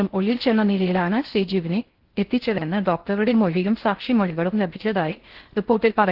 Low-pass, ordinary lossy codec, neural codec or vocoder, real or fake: 5.4 kHz; Opus, 16 kbps; codec, 16 kHz in and 24 kHz out, 0.6 kbps, FocalCodec, streaming, 4096 codes; fake